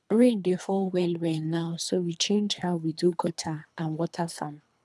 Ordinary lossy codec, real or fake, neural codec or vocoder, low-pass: none; fake; codec, 24 kHz, 3 kbps, HILCodec; none